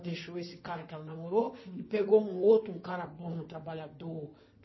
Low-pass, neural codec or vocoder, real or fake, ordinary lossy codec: 7.2 kHz; codec, 16 kHz in and 24 kHz out, 2.2 kbps, FireRedTTS-2 codec; fake; MP3, 24 kbps